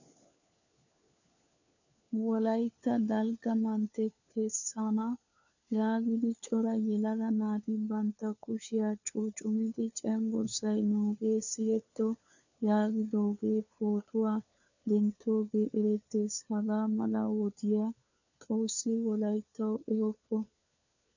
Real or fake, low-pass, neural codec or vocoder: fake; 7.2 kHz; codec, 16 kHz, 4 kbps, FunCodec, trained on LibriTTS, 50 frames a second